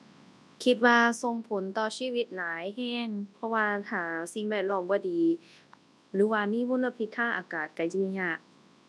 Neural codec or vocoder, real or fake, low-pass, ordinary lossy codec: codec, 24 kHz, 0.9 kbps, WavTokenizer, large speech release; fake; none; none